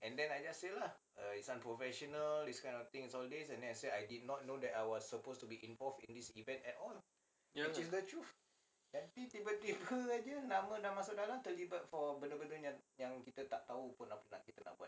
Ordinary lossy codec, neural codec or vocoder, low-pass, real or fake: none; none; none; real